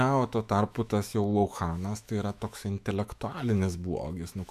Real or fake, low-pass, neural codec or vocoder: fake; 14.4 kHz; autoencoder, 48 kHz, 128 numbers a frame, DAC-VAE, trained on Japanese speech